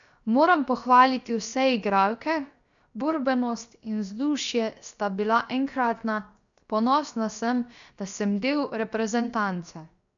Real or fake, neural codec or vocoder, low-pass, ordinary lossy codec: fake; codec, 16 kHz, 0.7 kbps, FocalCodec; 7.2 kHz; none